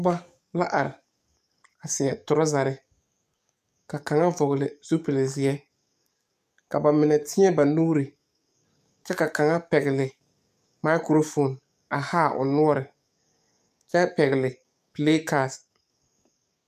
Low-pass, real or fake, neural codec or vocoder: 14.4 kHz; fake; codec, 44.1 kHz, 7.8 kbps, DAC